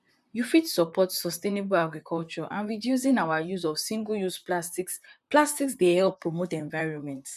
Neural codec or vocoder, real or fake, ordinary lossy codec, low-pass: vocoder, 44.1 kHz, 128 mel bands, Pupu-Vocoder; fake; none; 14.4 kHz